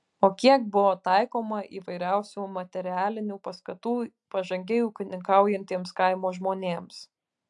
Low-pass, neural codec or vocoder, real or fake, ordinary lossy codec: 10.8 kHz; none; real; AAC, 64 kbps